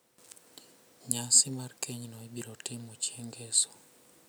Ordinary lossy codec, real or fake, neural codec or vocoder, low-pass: none; real; none; none